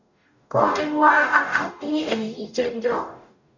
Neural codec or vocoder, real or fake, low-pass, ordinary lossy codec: codec, 44.1 kHz, 0.9 kbps, DAC; fake; 7.2 kHz; none